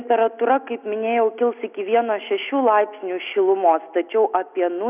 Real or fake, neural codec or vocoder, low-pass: real; none; 3.6 kHz